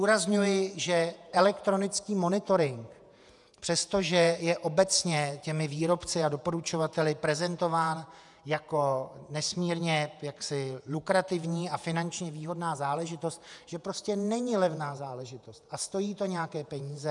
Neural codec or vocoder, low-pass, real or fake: vocoder, 48 kHz, 128 mel bands, Vocos; 10.8 kHz; fake